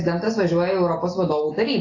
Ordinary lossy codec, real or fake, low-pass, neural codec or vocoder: AAC, 32 kbps; real; 7.2 kHz; none